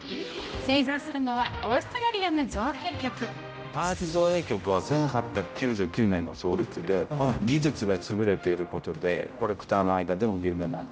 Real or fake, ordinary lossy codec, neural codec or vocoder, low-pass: fake; none; codec, 16 kHz, 0.5 kbps, X-Codec, HuBERT features, trained on general audio; none